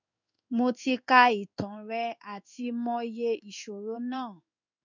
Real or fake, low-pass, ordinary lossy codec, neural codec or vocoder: fake; 7.2 kHz; none; codec, 16 kHz in and 24 kHz out, 1 kbps, XY-Tokenizer